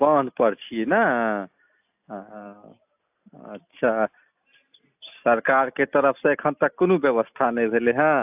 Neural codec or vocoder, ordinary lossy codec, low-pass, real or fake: none; AAC, 32 kbps; 3.6 kHz; real